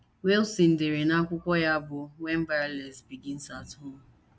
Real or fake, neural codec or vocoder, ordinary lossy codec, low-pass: real; none; none; none